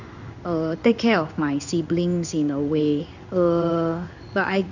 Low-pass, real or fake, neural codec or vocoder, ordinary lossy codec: 7.2 kHz; fake; codec, 16 kHz in and 24 kHz out, 1 kbps, XY-Tokenizer; none